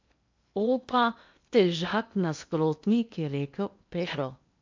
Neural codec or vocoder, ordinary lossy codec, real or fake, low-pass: codec, 16 kHz in and 24 kHz out, 0.8 kbps, FocalCodec, streaming, 65536 codes; MP3, 64 kbps; fake; 7.2 kHz